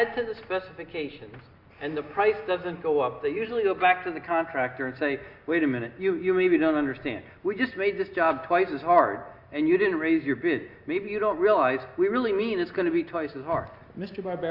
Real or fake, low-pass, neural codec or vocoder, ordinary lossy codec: real; 5.4 kHz; none; Opus, 64 kbps